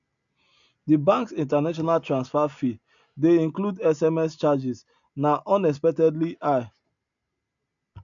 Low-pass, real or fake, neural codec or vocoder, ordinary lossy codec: 7.2 kHz; real; none; none